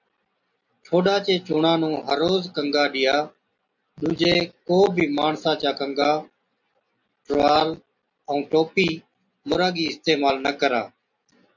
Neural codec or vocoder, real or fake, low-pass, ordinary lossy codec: none; real; 7.2 kHz; MP3, 48 kbps